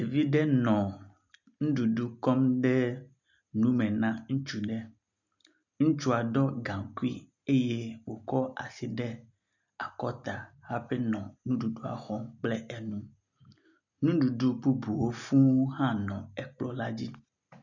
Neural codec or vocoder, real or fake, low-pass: none; real; 7.2 kHz